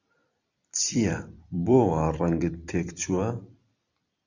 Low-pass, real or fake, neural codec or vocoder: 7.2 kHz; fake; vocoder, 44.1 kHz, 128 mel bands every 256 samples, BigVGAN v2